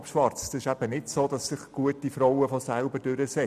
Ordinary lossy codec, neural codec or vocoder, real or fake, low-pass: none; none; real; 14.4 kHz